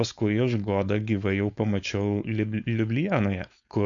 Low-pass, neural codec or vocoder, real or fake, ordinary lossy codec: 7.2 kHz; codec, 16 kHz, 4.8 kbps, FACodec; fake; AAC, 64 kbps